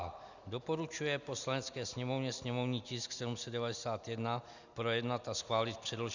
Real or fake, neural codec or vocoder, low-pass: real; none; 7.2 kHz